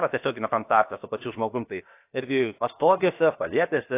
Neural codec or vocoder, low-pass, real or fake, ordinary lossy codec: codec, 16 kHz, 0.7 kbps, FocalCodec; 3.6 kHz; fake; AAC, 24 kbps